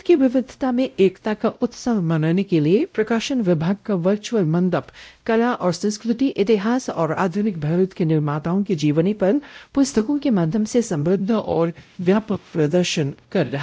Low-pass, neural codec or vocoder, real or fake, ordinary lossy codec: none; codec, 16 kHz, 0.5 kbps, X-Codec, WavLM features, trained on Multilingual LibriSpeech; fake; none